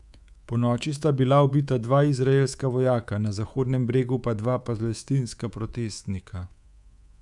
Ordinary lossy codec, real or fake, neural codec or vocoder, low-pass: none; fake; codec, 24 kHz, 3.1 kbps, DualCodec; 10.8 kHz